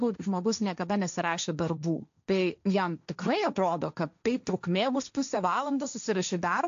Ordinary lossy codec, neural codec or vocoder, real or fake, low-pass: AAC, 64 kbps; codec, 16 kHz, 1.1 kbps, Voila-Tokenizer; fake; 7.2 kHz